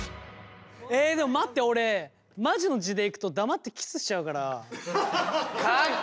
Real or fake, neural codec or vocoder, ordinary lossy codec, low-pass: real; none; none; none